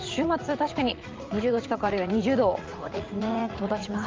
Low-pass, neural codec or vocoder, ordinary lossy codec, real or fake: 7.2 kHz; vocoder, 44.1 kHz, 80 mel bands, Vocos; Opus, 32 kbps; fake